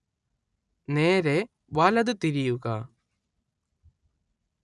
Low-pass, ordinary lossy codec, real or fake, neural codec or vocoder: 10.8 kHz; none; real; none